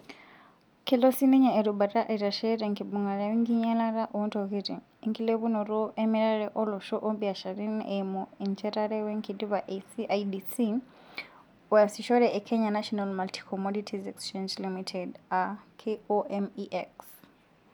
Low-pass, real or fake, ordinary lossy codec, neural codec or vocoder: 19.8 kHz; real; none; none